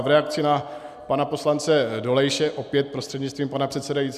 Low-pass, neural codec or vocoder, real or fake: 14.4 kHz; none; real